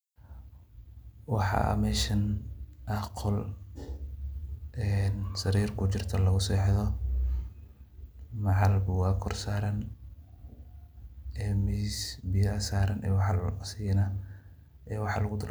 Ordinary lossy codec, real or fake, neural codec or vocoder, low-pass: none; real; none; none